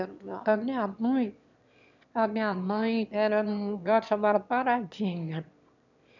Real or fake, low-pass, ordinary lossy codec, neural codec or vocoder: fake; 7.2 kHz; none; autoencoder, 22.05 kHz, a latent of 192 numbers a frame, VITS, trained on one speaker